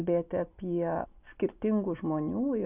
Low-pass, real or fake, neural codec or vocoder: 3.6 kHz; real; none